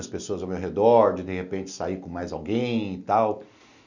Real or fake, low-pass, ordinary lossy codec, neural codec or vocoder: real; 7.2 kHz; none; none